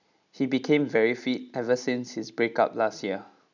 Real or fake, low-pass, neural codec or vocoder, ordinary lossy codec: real; 7.2 kHz; none; none